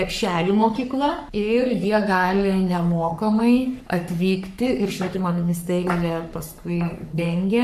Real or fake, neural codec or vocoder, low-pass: fake; codec, 44.1 kHz, 3.4 kbps, Pupu-Codec; 14.4 kHz